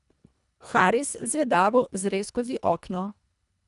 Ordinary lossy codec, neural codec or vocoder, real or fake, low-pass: none; codec, 24 kHz, 1.5 kbps, HILCodec; fake; 10.8 kHz